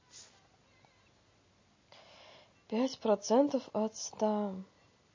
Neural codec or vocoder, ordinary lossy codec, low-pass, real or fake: none; MP3, 32 kbps; 7.2 kHz; real